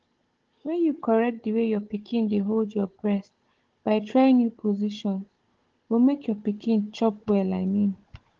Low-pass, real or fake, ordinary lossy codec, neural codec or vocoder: 7.2 kHz; fake; Opus, 16 kbps; codec, 16 kHz, 16 kbps, FunCodec, trained on Chinese and English, 50 frames a second